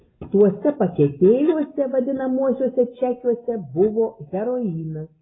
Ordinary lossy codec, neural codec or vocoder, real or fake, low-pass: AAC, 16 kbps; none; real; 7.2 kHz